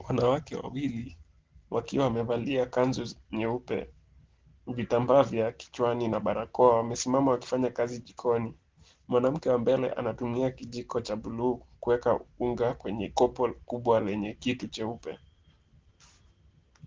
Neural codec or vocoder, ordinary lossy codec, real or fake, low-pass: none; Opus, 16 kbps; real; 7.2 kHz